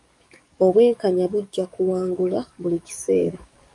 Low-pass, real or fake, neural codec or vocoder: 10.8 kHz; fake; codec, 44.1 kHz, 7.8 kbps, DAC